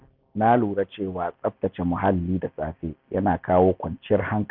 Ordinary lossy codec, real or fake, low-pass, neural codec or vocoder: Opus, 64 kbps; real; 5.4 kHz; none